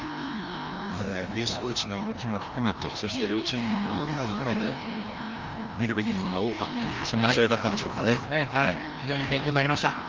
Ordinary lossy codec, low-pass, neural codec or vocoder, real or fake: Opus, 32 kbps; 7.2 kHz; codec, 16 kHz, 1 kbps, FreqCodec, larger model; fake